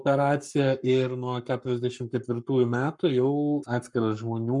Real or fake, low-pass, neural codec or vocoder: fake; 10.8 kHz; codec, 44.1 kHz, 7.8 kbps, Pupu-Codec